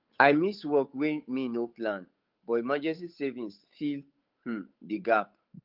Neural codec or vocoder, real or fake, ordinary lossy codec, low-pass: codec, 16 kHz, 8 kbps, FunCodec, trained on Chinese and English, 25 frames a second; fake; Opus, 24 kbps; 5.4 kHz